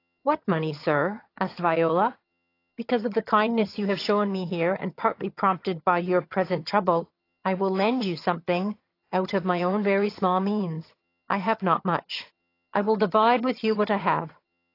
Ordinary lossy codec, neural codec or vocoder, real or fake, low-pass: AAC, 32 kbps; vocoder, 22.05 kHz, 80 mel bands, HiFi-GAN; fake; 5.4 kHz